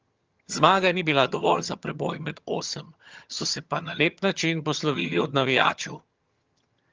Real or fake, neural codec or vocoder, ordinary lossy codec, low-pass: fake; vocoder, 22.05 kHz, 80 mel bands, HiFi-GAN; Opus, 24 kbps; 7.2 kHz